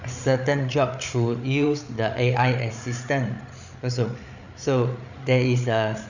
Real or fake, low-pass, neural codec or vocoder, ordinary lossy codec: fake; 7.2 kHz; codec, 16 kHz, 8 kbps, FreqCodec, larger model; none